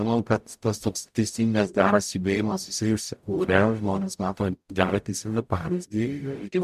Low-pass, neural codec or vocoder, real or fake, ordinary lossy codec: 14.4 kHz; codec, 44.1 kHz, 0.9 kbps, DAC; fake; MP3, 96 kbps